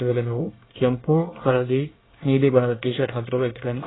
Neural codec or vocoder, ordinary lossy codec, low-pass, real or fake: codec, 24 kHz, 1 kbps, SNAC; AAC, 16 kbps; 7.2 kHz; fake